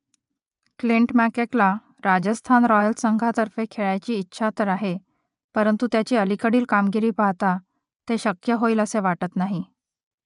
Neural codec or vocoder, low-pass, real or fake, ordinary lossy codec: vocoder, 24 kHz, 100 mel bands, Vocos; 10.8 kHz; fake; none